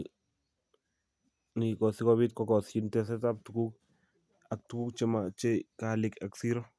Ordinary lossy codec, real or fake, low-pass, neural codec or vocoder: none; real; none; none